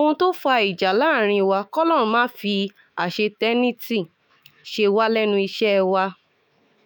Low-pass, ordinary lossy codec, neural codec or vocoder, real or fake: none; none; autoencoder, 48 kHz, 128 numbers a frame, DAC-VAE, trained on Japanese speech; fake